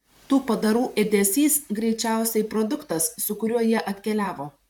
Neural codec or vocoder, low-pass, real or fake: vocoder, 44.1 kHz, 128 mel bands, Pupu-Vocoder; 19.8 kHz; fake